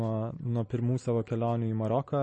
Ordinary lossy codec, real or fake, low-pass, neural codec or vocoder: MP3, 32 kbps; real; 10.8 kHz; none